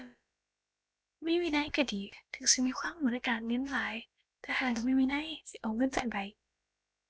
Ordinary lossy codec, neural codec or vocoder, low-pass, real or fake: none; codec, 16 kHz, about 1 kbps, DyCAST, with the encoder's durations; none; fake